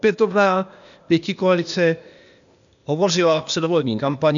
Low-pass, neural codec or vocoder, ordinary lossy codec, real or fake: 7.2 kHz; codec, 16 kHz, 0.8 kbps, ZipCodec; MP3, 96 kbps; fake